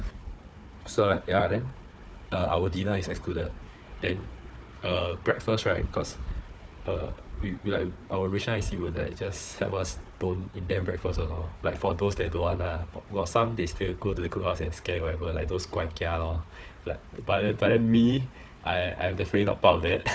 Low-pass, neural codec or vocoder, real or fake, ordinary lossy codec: none; codec, 16 kHz, 4 kbps, FunCodec, trained on Chinese and English, 50 frames a second; fake; none